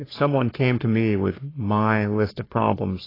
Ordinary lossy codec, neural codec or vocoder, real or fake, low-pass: AAC, 24 kbps; codec, 44.1 kHz, 3.4 kbps, Pupu-Codec; fake; 5.4 kHz